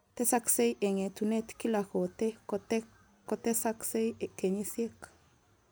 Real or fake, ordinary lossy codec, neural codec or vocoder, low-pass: real; none; none; none